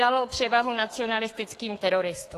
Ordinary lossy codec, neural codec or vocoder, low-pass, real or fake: AAC, 48 kbps; codec, 32 kHz, 1.9 kbps, SNAC; 14.4 kHz; fake